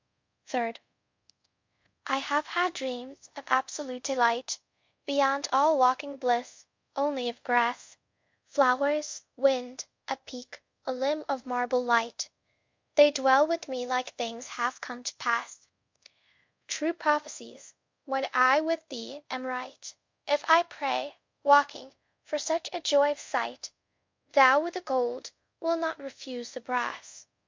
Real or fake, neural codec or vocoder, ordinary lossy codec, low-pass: fake; codec, 24 kHz, 0.5 kbps, DualCodec; MP3, 48 kbps; 7.2 kHz